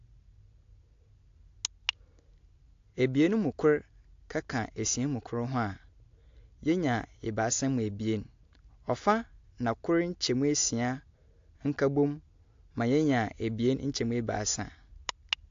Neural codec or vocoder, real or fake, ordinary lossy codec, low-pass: none; real; AAC, 48 kbps; 7.2 kHz